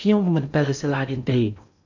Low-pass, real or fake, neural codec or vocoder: 7.2 kHz; fake; codec, 16 kHz in and 24 kHz out, 0.8 kbps, FocalCodec, streaming, 65536 codes